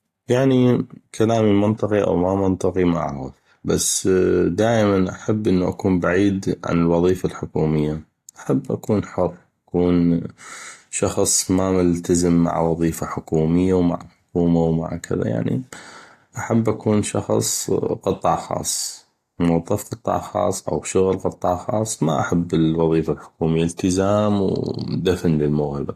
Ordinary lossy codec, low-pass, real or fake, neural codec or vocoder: AAC, 48 kbps; 14.4 kHz; real; none